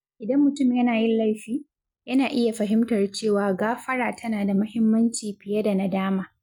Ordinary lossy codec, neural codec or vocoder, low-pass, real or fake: none; none; 19.8 kHz; real